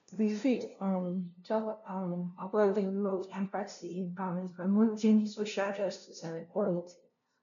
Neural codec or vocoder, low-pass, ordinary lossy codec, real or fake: codec, 16 kHz, 0.5 kbps, FunCodec, trained on LibriTTS, 25 frames a second; 7.2 kHz; none; fake